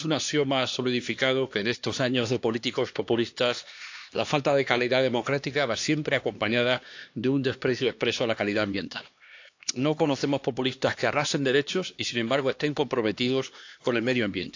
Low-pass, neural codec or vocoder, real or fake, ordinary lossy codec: 7.2 kHz; codec, 16 kHz, 2 kbps, X-Codec, HuBERT features, trained on LibriSpeech; fake; AAC, 48 kbps